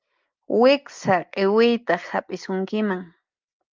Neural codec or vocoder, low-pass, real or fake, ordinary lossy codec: none; 7.2 kHz; real; Opus, 24 kbps